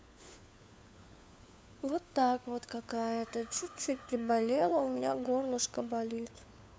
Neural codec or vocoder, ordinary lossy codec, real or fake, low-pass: codec, 16 kHz, 4 kbps, FunCodec, trained on LibriTTS, 50 frames a second; none; fake; none